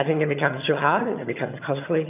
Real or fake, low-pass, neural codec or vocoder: fake; 3.6 kHz; vocoder, 22.05 kHz, 80 mel bands, HiFi-GAN